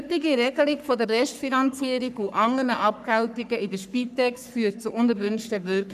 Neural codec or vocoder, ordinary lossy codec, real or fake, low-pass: codec, 44.1 kHz, 3.4 kbps, Pupu-Codec; none; fake; 14.4 kHz